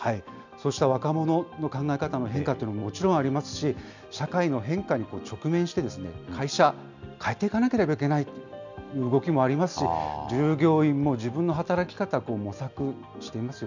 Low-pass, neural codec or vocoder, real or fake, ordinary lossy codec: 7.2 kHz; none; real; none